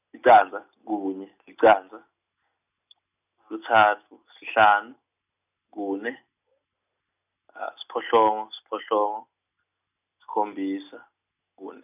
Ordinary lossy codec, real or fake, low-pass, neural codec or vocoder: none; real; 3.6 kHz; none